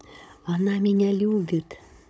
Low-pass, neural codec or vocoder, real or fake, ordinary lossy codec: none; codec, 16 kHz, 16 kbps, FunCodec, trained on Chinese and English, 50 frames a second; fake; none